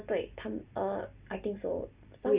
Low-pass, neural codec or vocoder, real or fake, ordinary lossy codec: 3.6 kHz; none; real; Opus, 64 kbps